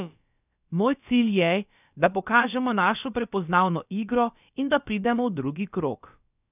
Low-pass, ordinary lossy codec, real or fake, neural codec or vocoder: 3.6 kHz; none; fake; codec, 16 kHz, about 1 kbps, DyCAST, with the encoder's durations